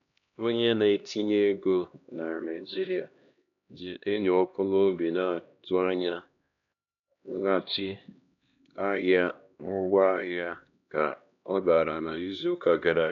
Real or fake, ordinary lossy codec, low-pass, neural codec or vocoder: fake; none; 7.2 kHz; codec, 16 kHz, 1 kbps, X-Codec, HuBERT features, trained on LibriSpeech